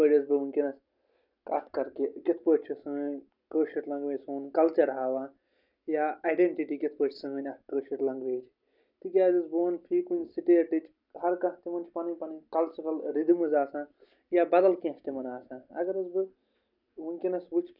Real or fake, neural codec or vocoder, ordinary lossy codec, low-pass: real; none; none; 5.4 kHz